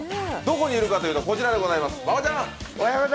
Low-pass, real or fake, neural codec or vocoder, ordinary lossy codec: none; real; none; none